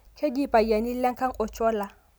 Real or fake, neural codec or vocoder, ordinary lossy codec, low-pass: real; none; none; none